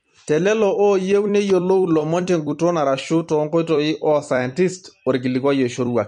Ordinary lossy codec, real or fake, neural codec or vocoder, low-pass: MP3, 48 kbps; fake; vocoder, 44.1 kHz, 128 mel bands every 256 samples, BigVGAN v2; 14.4 kHz